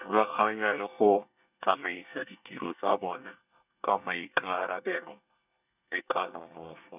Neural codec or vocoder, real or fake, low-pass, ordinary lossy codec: codec, 24 kHz, 1 kbps, SNAC; fake; 3.6 kHz; none